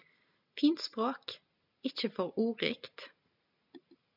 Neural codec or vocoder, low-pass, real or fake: none; 5.4 kHz; real